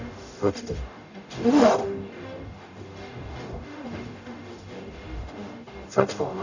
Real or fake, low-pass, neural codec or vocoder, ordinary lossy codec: fake; 7.2 kHz; codec, 44.1 kHz, 0.9 kbps, DAC; none